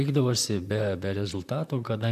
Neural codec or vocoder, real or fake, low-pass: vocoder, 44.1 kHz, 128 mel bands, Pupu-Vocoder; fake; 14.4 kHz